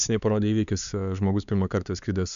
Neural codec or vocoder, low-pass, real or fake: codec, 16 kHz, 4 kbps, X-Codec, HuBERT features, trained on LibriSpeech; 7.2 kHz; fake